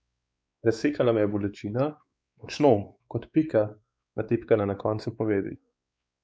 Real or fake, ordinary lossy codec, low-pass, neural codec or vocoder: fake; none; none; codec, 16 kHz, 4 kbps, X-Codec, WavLM features, trained on Multilingual LibriSpeech